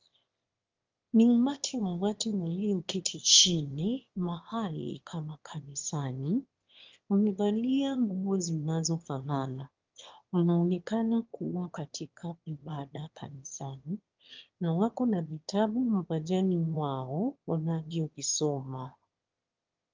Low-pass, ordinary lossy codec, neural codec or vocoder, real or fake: 7.2 kHz; Opus, 32 kbps; autoencoder, 22.05 kHz, a latent of 192 numbers a frame, VITS, trained on one speaker; fake